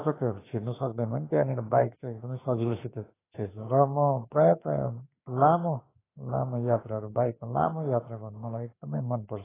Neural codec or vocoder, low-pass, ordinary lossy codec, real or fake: autoencoder, 48 kHz, 32 numbers a frame, DAC-VAE, trained on Japanese speech; 3.6 kHz; AAC, 16 kbps; fake